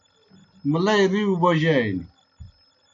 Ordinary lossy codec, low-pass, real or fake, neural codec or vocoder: MP3, 64 kbps; 7.2 kHz; real; none